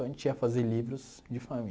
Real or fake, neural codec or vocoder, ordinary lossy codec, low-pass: real; none; none; none